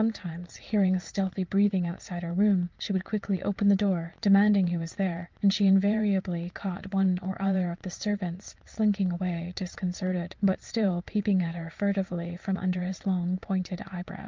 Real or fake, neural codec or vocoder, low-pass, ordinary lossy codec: fake; vocoder, 44.1 kHz, 80 mel bands, Vocos; 7.2 kHz; Opus, 24 kbps